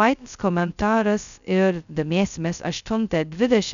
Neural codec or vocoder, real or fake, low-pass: codec, 16 kHz, 0.2 kbps, FocalCodec; fake; 7.2 kHz